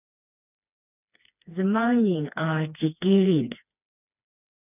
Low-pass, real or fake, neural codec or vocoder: 3.6 kHz; fake; codec, 16 kHz, 2 kbps, FreqCodec, smaller model